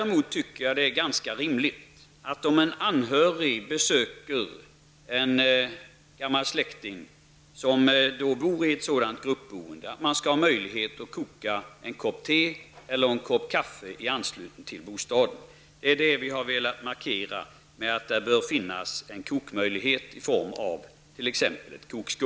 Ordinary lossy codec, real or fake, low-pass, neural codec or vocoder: none; real; none; none